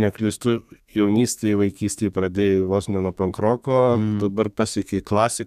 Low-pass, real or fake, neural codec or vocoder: 14.4 kHz; fake; codec, 32 kHz, 1.9 kbps, SNAC